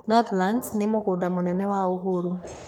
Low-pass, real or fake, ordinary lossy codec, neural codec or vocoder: none; fake; none; codec, 44.1 kHz, 3.4 kbps, Pupu-Codec